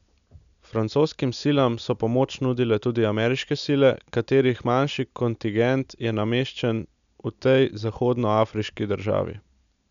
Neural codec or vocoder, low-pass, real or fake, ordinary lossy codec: none; 7.2 kHz; real; none